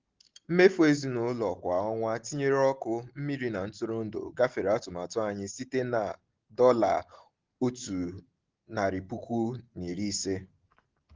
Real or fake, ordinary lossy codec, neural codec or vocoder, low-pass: real; Opus, 16 kbps; none; 7.2 kHz